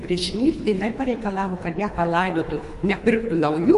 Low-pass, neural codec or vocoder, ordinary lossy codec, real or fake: 10.8 kHz; codec, 24 kHz, 3 kbps, HILCodec; MP3, 64 kbps; fake